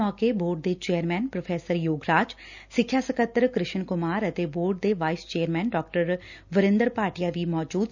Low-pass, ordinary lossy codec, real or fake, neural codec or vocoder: 7.2 kHz; none; real; none